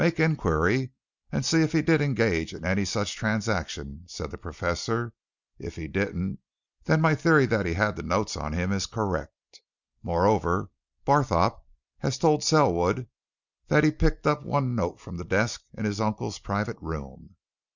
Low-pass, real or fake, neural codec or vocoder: 7.2 kHz; real; none